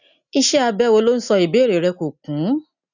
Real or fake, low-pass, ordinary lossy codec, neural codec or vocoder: real; 7.2 kHz; none; none